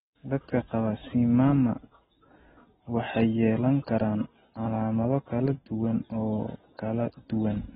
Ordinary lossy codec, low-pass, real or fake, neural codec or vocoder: AAC, 16 kbps; 19.8 kHz; real; none